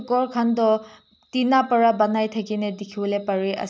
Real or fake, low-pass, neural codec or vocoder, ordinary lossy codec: real; none; none; none